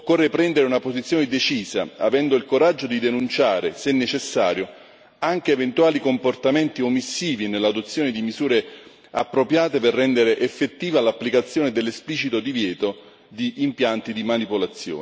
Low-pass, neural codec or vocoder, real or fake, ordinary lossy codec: none; none; real; none